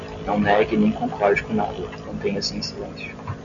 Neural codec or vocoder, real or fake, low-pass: none; real; 7.2 kHz